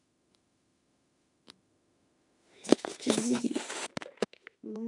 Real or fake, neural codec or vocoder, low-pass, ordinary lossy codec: fake; autoencoder, 48 kHz, 32 numbers a frame, DAC-VAE, trained on Japanese speech; 10.8 kHz; none